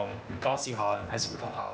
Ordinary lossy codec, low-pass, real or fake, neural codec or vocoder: none; none; fake; codec, 16 kHz, 0.8 kbps, ZipCodec